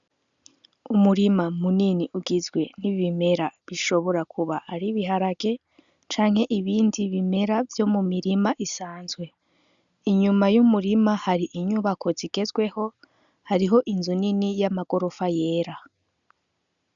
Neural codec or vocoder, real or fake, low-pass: none; real; 7.2 kHz